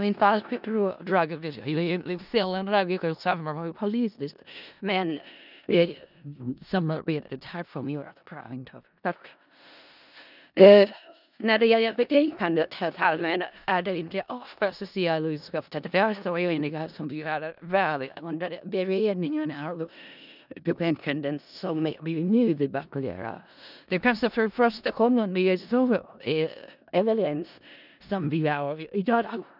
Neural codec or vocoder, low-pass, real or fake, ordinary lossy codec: codec, 16 kHz in and 24 kHz out, 0.4 kbps, LongCat-Audio-Codec, four codebook decoder; 5.4 kHz; fake; none